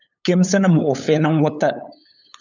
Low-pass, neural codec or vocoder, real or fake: 7.2 kHz; codec, 16 kHz, 8 kbps, FunCodec, trained on LibriTTS, 25 frames a second; fake